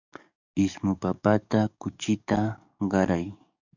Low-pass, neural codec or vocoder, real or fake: 7.2 kHz; codec, 44.1 kHz, 7.8 kbps, DAC; fake